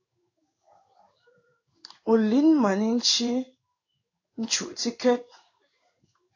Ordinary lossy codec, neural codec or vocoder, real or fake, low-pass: AAC, 48 kbps; codec, 16 kHz in and 24 kHz out, 1 kbps, XY-Tokenizer; fake; 7.2 kHz